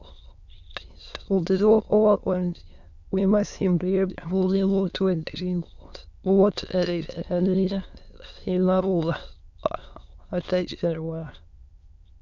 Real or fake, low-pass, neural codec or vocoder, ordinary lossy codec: fake; 7.2 kHz; autoencoder, 22.05 kHz, a latent of 192 numbers a frame, VITS, trained on many speakers; Opus, 64 kbps